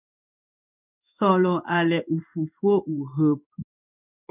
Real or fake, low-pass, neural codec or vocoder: fake; 3.6 kHz; codec, 16 kHz in and 24 kHz out, 1 kbps, XY-Tokenizer